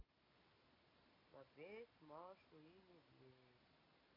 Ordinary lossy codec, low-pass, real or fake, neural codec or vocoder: MP3, 24 kbps; 5.4 kHz; real; none